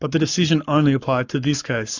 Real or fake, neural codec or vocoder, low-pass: fake; codec, 44.1 kHz, 7.8 kbps, Pupu-Codec; 7.2 kHz